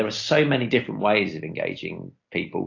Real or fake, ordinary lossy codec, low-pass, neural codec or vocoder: real; AAC, 48 kbps; 7.2 kHz; none